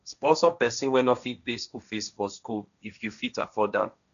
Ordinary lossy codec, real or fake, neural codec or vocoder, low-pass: none; fake; codec, 16 kHz, 1.1 kbps, Voila-Tokenizer; 7.2 kHz